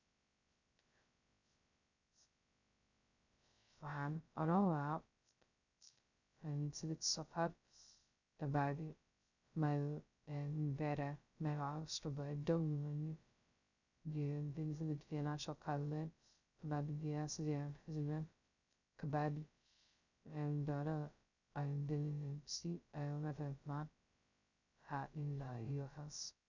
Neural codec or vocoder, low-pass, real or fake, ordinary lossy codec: codec, 16 kHz, 0.2 kbps, FocalCodec; 7.2 kHz; fake; none